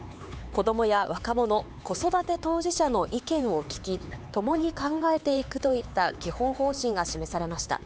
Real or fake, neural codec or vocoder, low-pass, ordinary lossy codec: fake; codec, 16 kHz, 4 kbps, X-Codec, HuBERT features, trained on LibriSpeech; none; none